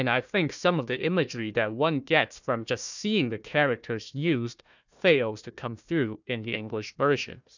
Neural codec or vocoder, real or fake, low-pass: codec, 16 kHz, 1 kbps, FunCodec, trained on Chinese and English, 50 frames a second; fake; 7.2 kHz